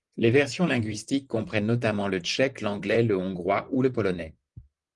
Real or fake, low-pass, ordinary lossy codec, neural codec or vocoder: fake; 10.8 kHz; Opus, 24 kbps; vocoder, 44.1 kHz, 128 mel bands, Pupu-Vocoder